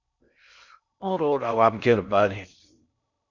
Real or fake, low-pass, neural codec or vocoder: fake; 7.2 kHz; codec, 16 kHz in and 24 kHz out, 0.6 kbps, FocalCodec, streaming, 4096 codes